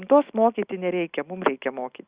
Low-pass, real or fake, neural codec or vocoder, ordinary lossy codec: 3.6 kHz; real; none; Opus, 64 kbps